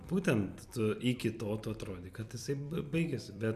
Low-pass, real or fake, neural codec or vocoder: 14.4 kHz; fake; vocoder, 44.1 kHz, 128 mel bands every 256 samples, BigVGAN v2